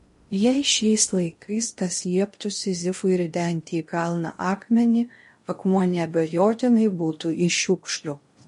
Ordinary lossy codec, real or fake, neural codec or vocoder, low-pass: MP3, 48 kbps; fake; codec, 16 kHz in and 24 kHz out, 0.6 kbps, FocalCodec, streaming, 2048 codes; 10.8 kHz